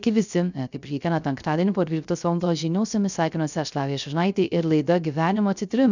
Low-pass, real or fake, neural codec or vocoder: 7.2 kHz; fake; codec, 16 kHz, 0.3 kbps, FocalCodec